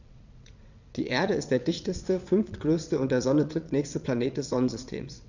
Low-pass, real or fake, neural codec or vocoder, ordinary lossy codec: 7.2 kHz; fake; vocoder, 22.05 kHz, 80 mel bands, WaveNeXt; none